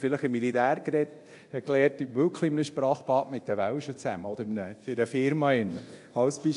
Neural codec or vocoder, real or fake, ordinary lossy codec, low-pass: codec, 24 kHz, 0.9 kbps, DualCodec; fake; AAC, 64 kbps; 10.8 kHz